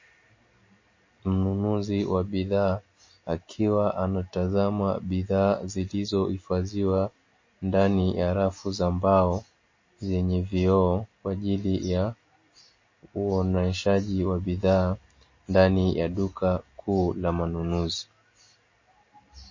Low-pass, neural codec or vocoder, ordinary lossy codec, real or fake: 7.2 kHz; none; MP3, 32 kbps; real